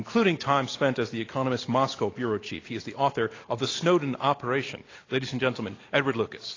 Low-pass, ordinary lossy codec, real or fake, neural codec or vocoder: 7.2 kHz; AAC, 32 kbps; real; none